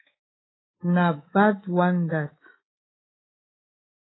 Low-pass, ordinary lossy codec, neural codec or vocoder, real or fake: 7.2 kHz; AAC, 16 kbps; codec, 24 kHz, 3.1 kbps, DualCodec; fake